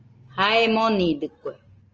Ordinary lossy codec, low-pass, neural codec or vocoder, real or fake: Opus, 24 kbps; 7.2 kHz; none; real